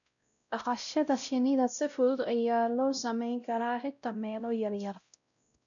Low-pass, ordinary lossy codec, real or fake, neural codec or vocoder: 7.2 kHz; none; fake; codec, 16 kHz, 0.5 kbps, X-Codec, WavLM features, trained on Multilingual LibriSpeech